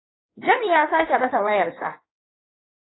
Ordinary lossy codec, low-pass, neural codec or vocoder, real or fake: AAC, 16 kbps; 7.2 kHz; codec, 16 kHz in and 24 kHz out, 1.1 kbps, FireRedTTS-2 codec; fake